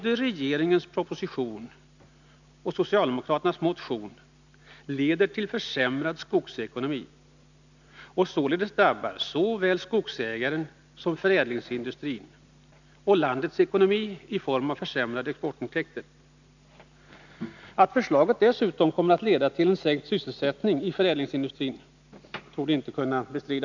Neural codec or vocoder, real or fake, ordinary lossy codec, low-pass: none; real; none; 7.2 kHz